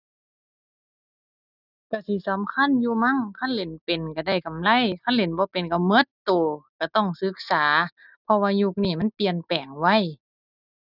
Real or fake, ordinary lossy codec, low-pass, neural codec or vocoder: real; none; 5.4 kHz; none